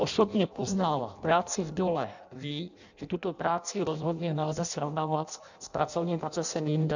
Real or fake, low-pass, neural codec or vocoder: fake; 7.2 kHz; codec, 16 kHz in and 24 kHz out, 0.6 kbps, FireRedTTS-2 codec